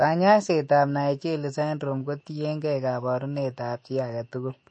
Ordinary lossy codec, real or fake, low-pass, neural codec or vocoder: MP3, 32 kbps; fake; 9.9 kHz; autoencoder, 48 kHz, 128 numbers a frame, DAC-VAE, trained on Japanese speech